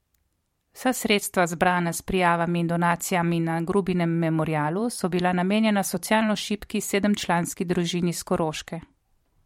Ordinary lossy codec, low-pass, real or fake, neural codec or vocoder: MP3, 64 kbps; 19.8 kHz; fake; vocoder, 44.1 kHz, 128 mel bands every 512 samples, BigVGAN v2